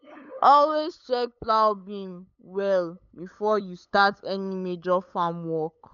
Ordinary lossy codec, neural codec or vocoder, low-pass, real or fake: none; codec, 16 kHz, 8 kbps, FunCodec, trained on LibriTTS, 25 frames a second; 7.2 kHz; fake